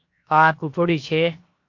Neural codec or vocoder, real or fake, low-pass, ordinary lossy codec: codec, 16 kHz, 0.7 kbps, FocalCodec; fake; 7.2 kHz; AAC, 48 kbps